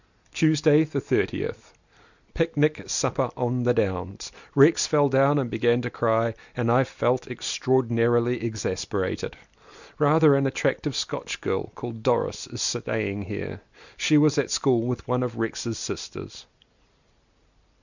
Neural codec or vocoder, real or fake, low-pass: none; real; 7.2 kHz